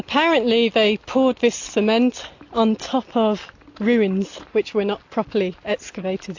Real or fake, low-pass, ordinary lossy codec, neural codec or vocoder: real; 7.2 kHz; AAC, 48 kbps; none